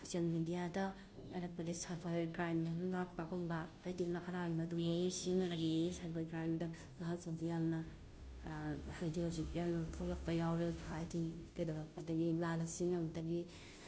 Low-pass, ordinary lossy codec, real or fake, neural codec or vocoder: none; none; fake; codec, 16 kHz, 0.5 kbps, FunCodec, trained on Chinese and English, 25 frames a second